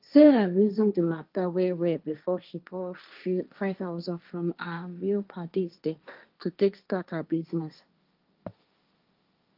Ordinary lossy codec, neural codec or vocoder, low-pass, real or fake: Opus, 24 kbps; codec, 16 kHz, 1.1 kbps, Voila-Tokenizer; 5.4 kHz; fake